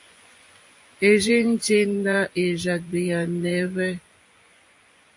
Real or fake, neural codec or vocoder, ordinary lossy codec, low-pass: fake; vocoder, 24 kHz, 100 mel bands, Vocos; AAC, 64 kbps; 10.8 kHz